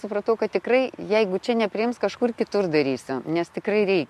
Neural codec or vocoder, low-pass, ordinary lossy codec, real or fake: none; 14.4 kHz; MP3, 64 kbps; real